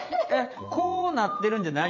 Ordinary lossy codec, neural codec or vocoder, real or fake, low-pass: none; vocoder, 22.05 kHz, 80 mel bands, Vocos; fake; 7.2 kHz